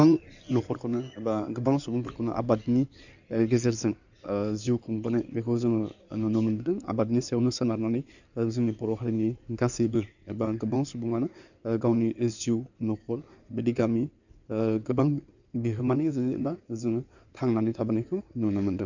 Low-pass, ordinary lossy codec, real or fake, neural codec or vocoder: 7.2 kHz; none; fake; codec, 16 kHz in and 24 kHz out, 2.2 kbps, FireRedTTS-2 codec